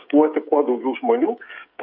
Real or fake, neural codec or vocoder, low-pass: fake; codec, 16 kHz, 8 kbps, FreqCodec, smaller model; 5.4 kHz